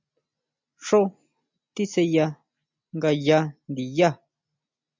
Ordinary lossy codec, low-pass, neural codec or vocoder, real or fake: MP3, 64 kbps; 7.2 kHz; none; real